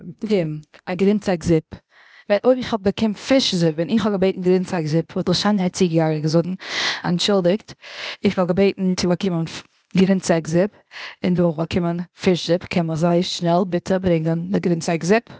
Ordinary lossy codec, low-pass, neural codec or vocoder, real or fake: none; none; codec, 16 kHz, 0.8 kbps, ZipCodec; fake